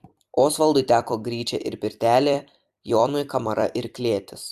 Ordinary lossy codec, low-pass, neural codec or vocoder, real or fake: Opus, 64 kbps; 14.4 kHz; vocoder, 44.1 kHz, 128 mel bands every 256 samples, BigVGAN v2; fake